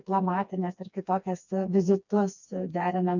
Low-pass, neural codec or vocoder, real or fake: 7.2 kHz; codec, 16 kHz, 2 kbps, FreqCodec, smaller model; fake